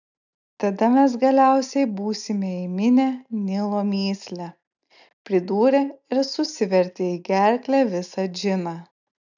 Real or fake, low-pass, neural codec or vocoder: real; 7.2 kHz; none